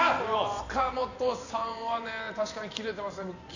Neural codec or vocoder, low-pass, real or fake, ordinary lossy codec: vocoder, 44.1 kHz, 128 mel bands every 512 samples, BigVGAN v2; 7.2 kHz; fake; none